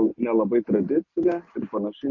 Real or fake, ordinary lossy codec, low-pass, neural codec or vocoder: real; MP3, 32 kbps; 7.2 kHz; none